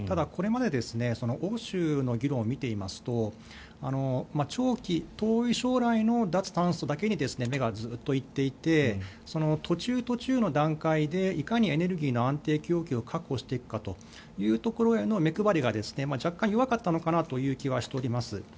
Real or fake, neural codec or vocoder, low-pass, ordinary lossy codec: real; none; none; none